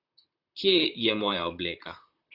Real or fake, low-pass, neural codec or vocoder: fake; 5.4 kHz; vocoder, 44.1 kHz, 128 mel bands, Pupu-Vocoder